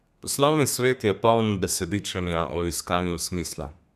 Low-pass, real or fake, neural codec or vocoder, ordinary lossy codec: 14.4 kHz; fake; codec, 32 kHz, 1.9 kbps, SNAC; none